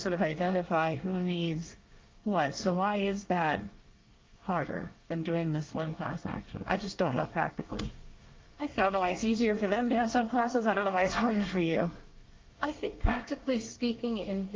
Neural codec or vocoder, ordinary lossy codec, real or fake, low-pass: codec, 24 kHz, 1 kbps, SNAC; Opus, 32 kbps; fake; 7.2 kHz